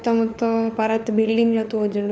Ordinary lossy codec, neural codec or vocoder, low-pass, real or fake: none; codec, 16 kHz, 4 kbps, FunCodec, trained on LibriTTS, 50 frames a second; none; fake